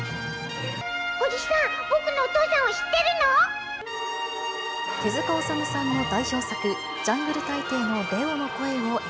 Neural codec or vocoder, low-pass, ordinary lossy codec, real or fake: none; none; none; real